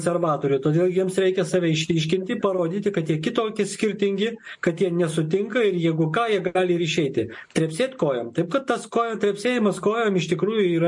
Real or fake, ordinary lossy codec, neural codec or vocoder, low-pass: real; MP3, 48 kbps; none; 10.8 kHz